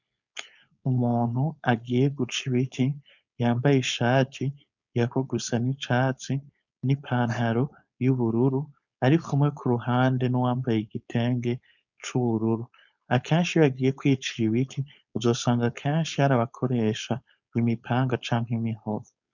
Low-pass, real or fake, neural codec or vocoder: 7.2 kHz; fake; codec, 16 kHz, 4.8 kbps, FACodec